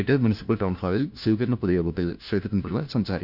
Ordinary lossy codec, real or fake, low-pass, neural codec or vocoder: none; fake; 5.4 kHz; codec, 16 kHz, 1 kbps, FunCodec, trained on LibriTTS, 50 frames a second